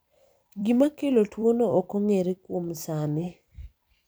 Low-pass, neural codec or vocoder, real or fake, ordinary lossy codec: none; vocoder, 44.1 kHz, 128 mel bands every 256 samples, BigVGAN v2; fake; none